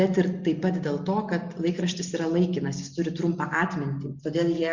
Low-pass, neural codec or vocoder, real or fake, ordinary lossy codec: 7.2 kHz; none; real; Opus, 64 kbps